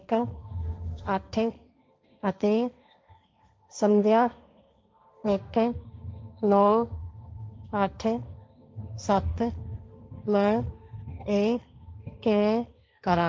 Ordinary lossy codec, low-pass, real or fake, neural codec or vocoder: none; none; fake; codec, 16 kHz, 1.1 kbps, Voila-Tokenizer